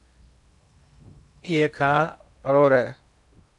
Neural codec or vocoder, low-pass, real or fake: codec, 16 kHz in and 24 kHz out, 0.8 kbps, FocalCodec, streaming, 65536 codes; 10.8 kHz; fake